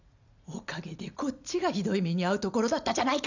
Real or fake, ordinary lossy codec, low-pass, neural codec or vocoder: real; none; 7.2 kHz; none